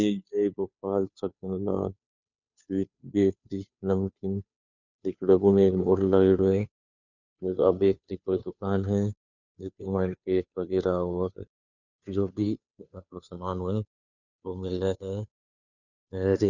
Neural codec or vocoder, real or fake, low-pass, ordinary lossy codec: codec, 16 kHz, 2 kbps, FunCodec, trained on Chinese and English, 25 frames a second; fake; 7.2 kHz; none